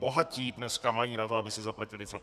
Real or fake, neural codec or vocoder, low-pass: fake; codec, 32 kHz, 1.9 kbps, SNAC; 14.4 kHz